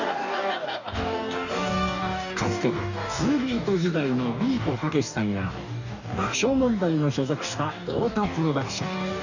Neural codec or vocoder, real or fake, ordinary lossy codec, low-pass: codec, 44.1 kHz, 2.6 kbps, DAC; fake; none; 7.2 kHz